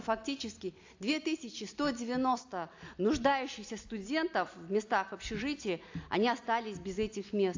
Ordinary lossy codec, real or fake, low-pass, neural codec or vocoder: AAC, 48 kbps; real; 7.2 kHz; none